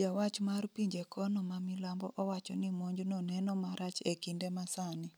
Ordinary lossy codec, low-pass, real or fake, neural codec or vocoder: none; none; real; none